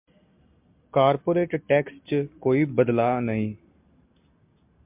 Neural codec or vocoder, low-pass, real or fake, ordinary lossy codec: none; 3.6 kHz; real; MP3, 32 kbps